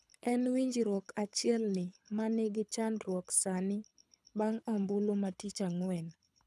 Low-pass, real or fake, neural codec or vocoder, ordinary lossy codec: none; fake; codec, 24 kHz, 6 kbps, HILCodec; none